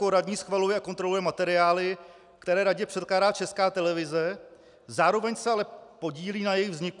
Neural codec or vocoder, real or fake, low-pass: none; real; 10.8 kHz